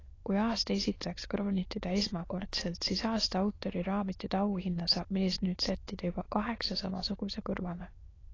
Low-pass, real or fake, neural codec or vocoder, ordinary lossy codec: 7.2 kHz; fake; autoencoder, 22.05 kHz, a latent of 192 numbers a frame, VITS, trained on many speakers; AAC, 32 kbps